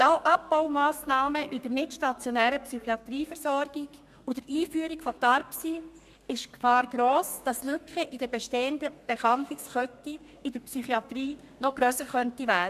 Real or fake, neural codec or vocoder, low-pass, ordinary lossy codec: fake; codec, 32 kHz, 1.9 kbps, SNAC; 14.4 kHz; MP3, 96 kbps